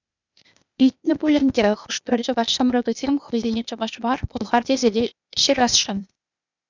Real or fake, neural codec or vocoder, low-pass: fake; codec, 16 kHz, 0.8 kbps, ZipCodec; 7.2 kHz